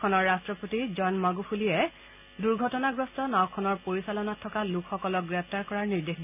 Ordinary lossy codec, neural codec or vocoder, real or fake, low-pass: none; none; real; 3.6 kHz